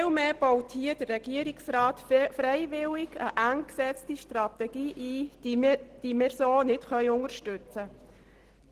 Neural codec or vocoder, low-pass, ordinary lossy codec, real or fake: none; 14.4 kHz; Opus, 16 kbps; real